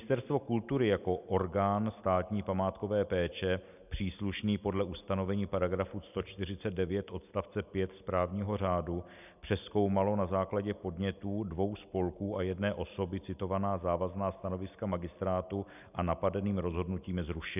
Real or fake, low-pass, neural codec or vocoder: real; 3.6 kHz; none